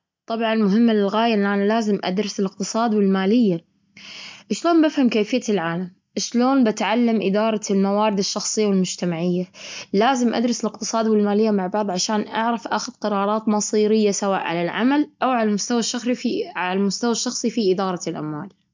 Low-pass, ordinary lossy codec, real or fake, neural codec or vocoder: 7.2 kHz; MP3, 64 kbps; real; none